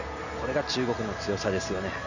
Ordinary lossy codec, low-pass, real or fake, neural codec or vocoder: none; 7.2 kHz; real; none